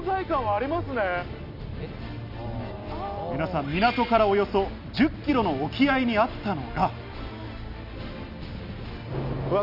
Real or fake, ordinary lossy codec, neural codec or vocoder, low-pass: real; none; none; 5.4 kHz